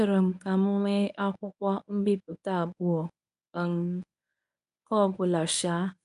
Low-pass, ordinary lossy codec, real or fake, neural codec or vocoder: 10.8 kHz; none; fake; codec, 24 kHz, 0.9 kbps, WavTokenizer, medium speech release version 1